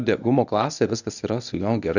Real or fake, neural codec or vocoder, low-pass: fake; codec, 24 kHz, 0.9 kbps, WavTokenizer, medium speech release version 1; 7.2 kHz